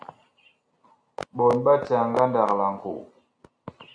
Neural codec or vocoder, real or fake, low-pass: none; real; 9.9 kHz